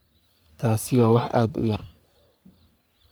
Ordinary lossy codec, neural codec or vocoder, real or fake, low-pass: none; codec, 44.1 kHz, 3.4 kbps, Pupu-Codec; fake; none